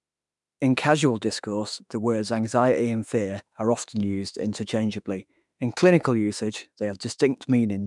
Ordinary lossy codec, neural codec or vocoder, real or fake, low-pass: none; autoencoder, 48 kHz, 32 numbers a frame, DAC-VAE, trained on Japanese speech; fake; 10.8 kHz